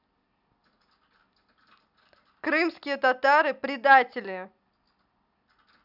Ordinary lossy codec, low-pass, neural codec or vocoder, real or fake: none; 5.4 kHz; none; real